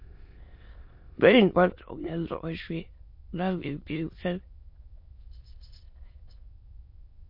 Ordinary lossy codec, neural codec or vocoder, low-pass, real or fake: MP3, 32 kbps; autoencoder, 22.05 kHz, a latent of 192 numbers a frame, VITS, trained on many speakers; 5.4 kHz; fake